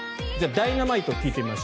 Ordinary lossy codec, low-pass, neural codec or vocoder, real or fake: none; none; none; real